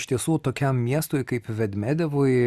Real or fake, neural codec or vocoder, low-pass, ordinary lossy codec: real; none; 14.4 kHz; Opus, 64 kbps